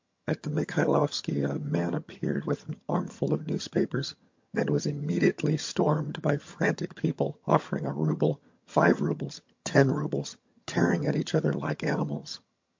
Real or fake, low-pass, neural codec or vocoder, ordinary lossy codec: fake; 7.2 kHz; vocoder, 22.05 kHz, 80 mel bands, HiFi-GAN; MP3, 48 kbps